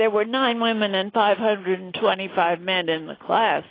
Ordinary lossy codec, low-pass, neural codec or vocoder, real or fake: AAC, 24 kbps; 5.4 kHz; none; real